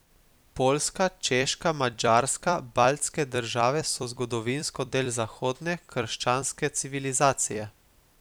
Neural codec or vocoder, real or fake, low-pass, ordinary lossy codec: vocoder, 44.1 kHz, 128 mel bands every 256 samples, BigVGAN v2; fake; none; none